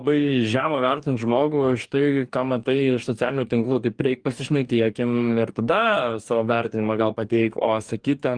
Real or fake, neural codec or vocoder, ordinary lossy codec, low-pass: fake; codec, 44.1 kHz, 2.6 kbps, DAC; Opus, 32 kbps; 9.9 kHz